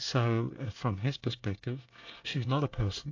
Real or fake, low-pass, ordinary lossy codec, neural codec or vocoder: fake; 7.2 kHz; AAC, 48 kbps; codec, 24 kHz, 1 kbps, SNAC